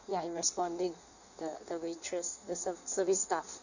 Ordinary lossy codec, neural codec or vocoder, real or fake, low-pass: none; codec, 16 kHz in and 24 kHz out, 1.1 kbps, FireRedTTS-2 codec; fake; 7.2 kHz